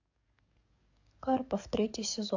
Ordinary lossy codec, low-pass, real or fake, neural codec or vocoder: AAC, 48 kbps; 7.2 kHz; fake; codec, 44.1 kHz, 7.8 kbps, DAC